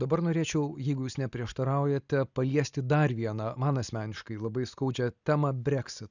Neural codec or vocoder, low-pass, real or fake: none; 7.2 kHz; real